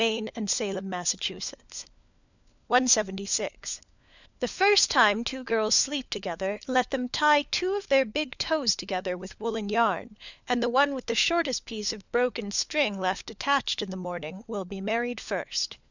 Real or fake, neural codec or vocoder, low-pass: fake; codec, 16 kHz, 4 kbps, FunCodec, trained on LibriTTS, 50 frames a second; 7.2 kHz